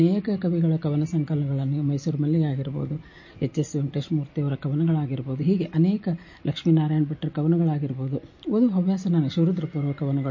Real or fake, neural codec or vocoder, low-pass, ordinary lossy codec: real; none; 7.2 kHz; MP3, 32 kbps